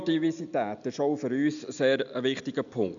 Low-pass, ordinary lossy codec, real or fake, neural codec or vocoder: 7.2 kHz; none; real; none